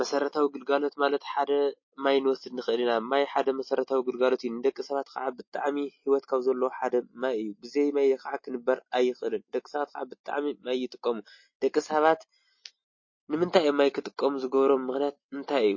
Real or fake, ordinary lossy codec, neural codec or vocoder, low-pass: real; MP3, 32 kbps; none; 7.2 kHz